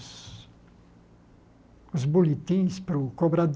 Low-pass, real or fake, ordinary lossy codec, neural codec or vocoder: none; real; none; none